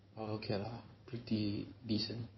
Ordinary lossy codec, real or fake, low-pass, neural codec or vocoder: MP3, 24 kbps; fake; 7.2 kHz; codec, 16 kHz in and 24 kHz out, 2.2 kbps, FireRedTTS-2 codec